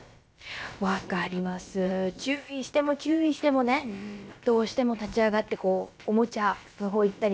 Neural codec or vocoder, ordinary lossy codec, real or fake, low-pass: codec, 16 kHz, about 1 kbps, DyCAST, with the encoder's durations; none; fake; none